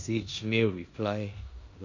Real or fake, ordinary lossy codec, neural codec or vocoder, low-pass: fake; none; codec, 16 kHz in and 24 kHz out, 0.9 kbps, LongCat-Audio-Codec, four codebook decoder; 7.2 kHz